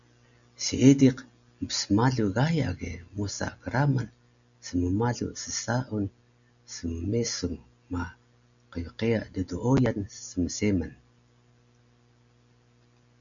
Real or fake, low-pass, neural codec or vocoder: real; 7.2 kHz; none